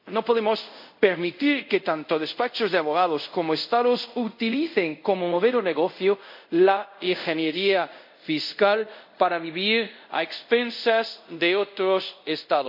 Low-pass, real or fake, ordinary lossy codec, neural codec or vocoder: 5.4 kHz; fake; MP3, 48 kbps; codec, 24 kHz, 0.5 kbps, DualCodec